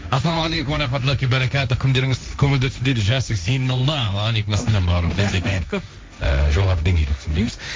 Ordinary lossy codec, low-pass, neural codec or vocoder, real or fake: none; none; codec, 16 kHz, 1.1 kbps, Voila-Tokenizer; fake